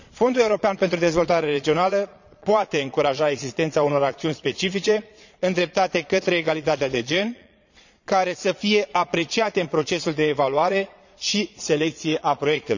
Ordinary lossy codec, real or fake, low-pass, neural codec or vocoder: none; fake; 7.2 kHz; vocoder, 22.05 kHz, 80 mel bands, Vocos